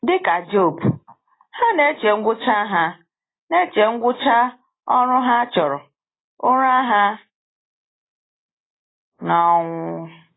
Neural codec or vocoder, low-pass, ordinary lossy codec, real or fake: none; 7.2 kHz; AAC, 16 kbps; real